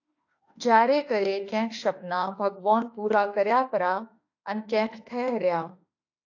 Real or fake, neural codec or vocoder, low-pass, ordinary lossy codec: fake; autoencoder, 48 kHz, 32 numbers a frame, DAC-VAE, trained on Japanese speech; 7.2 kHz; AAC, 48 kbps